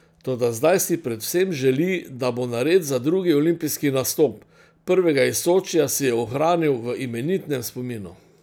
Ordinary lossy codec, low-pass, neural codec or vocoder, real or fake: none; none; none; real